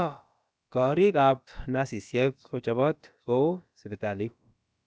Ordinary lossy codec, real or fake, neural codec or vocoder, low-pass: none; fake; codec, 16 kHz, about 1 kbps, DyCAST, with the encoder's durations; none